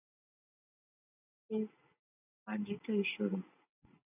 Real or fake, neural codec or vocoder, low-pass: real; none; 3.6 kHz